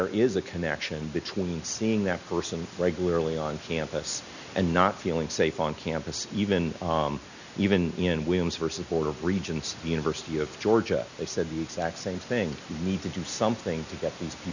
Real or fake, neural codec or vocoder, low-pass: real; none; 7.2 kHz